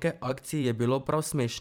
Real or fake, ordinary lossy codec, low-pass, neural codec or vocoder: fake; none; none; vocoder, 44.1 kHz, 128 mel bands every 512 samples, BigVGAN v2